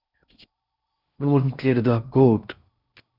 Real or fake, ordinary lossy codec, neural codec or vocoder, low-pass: fake; Opus, 64 kbps; codec, 16 kHz in and 24 kHz out, 0.6 kbps, FocalCodec, streaming, 4096 codes; 5.4 kHz